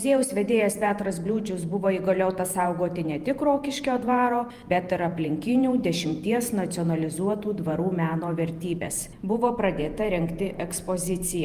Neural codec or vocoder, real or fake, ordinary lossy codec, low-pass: vocoder, 48 kHz, 128 mel bands, Vocos; fake; Opus, 32 kbps; 14.4 kHz